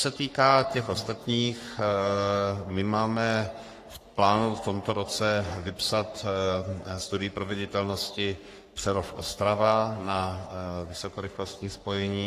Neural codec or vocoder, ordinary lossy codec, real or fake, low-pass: codec, 44.1 kHz, 3.4 kbps, Pupu-Codec; AAC, 48 kbps; fake; 14.4 kHz